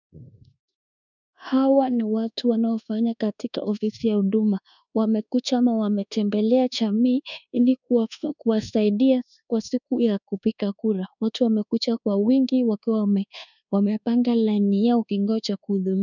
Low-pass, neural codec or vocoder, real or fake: 7.2 kHz; codec, 24 kHz, 1.2 kbps, DualCodec; fake